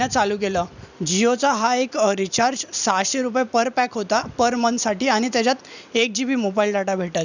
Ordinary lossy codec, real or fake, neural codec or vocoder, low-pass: none; fake; vocoder, 44.1 kHz, 128 mel bands every 256 samples, BigVGAN v2; 7.2 kHz